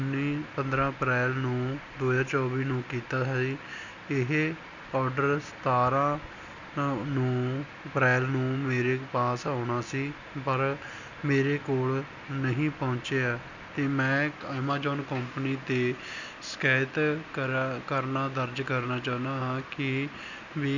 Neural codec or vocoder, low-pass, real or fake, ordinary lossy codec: none; 7.2 kHz; real; none